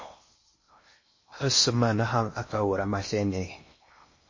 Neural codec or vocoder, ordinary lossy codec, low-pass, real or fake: codec, 16 kHz in and 24 kHz out, 0.6 kbps, FocalCodec, streaming, 2048 codes; MP3, 32 kbps; 7.2 kHz; fake